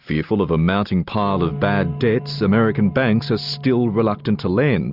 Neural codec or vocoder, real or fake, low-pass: none; real; 5.4 kHz